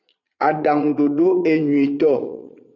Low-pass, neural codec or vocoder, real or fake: 7.2 kHz; vocoder, 22.05 kHz, 80 mel bands, Vocos; fake